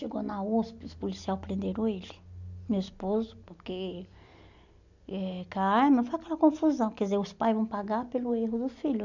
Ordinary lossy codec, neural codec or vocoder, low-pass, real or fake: none; none; 7.2 kHz; real